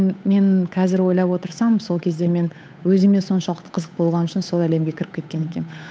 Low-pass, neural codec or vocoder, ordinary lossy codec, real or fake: none; codec, 16 kHz, 8 kbps, FunCodec, trained on Chinese and English, 25 frames a second; none; fake